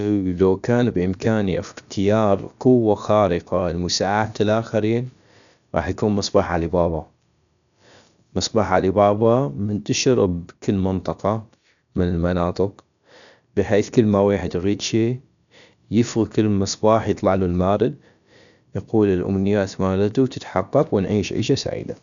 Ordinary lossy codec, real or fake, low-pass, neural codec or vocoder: none; fake; 7.2 kHz; codec, 16 kHz, about 1 kbps, DyCAST, with the encoder's durations